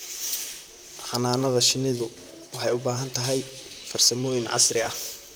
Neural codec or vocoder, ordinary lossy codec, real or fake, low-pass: vocoder, 44.1 kHz, 128 mel bands, Pupu-Vocoder; none; fake; none